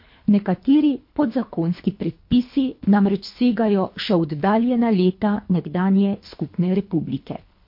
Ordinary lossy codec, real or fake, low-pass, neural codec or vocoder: MP3, 32 kbps; fake; 5.4 kHz; codec, 24 kHz, 3 kbps, HILCodec